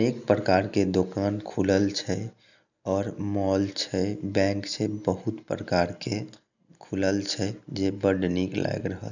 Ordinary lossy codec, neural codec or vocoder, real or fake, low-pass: none; none; real; 7.2 kHz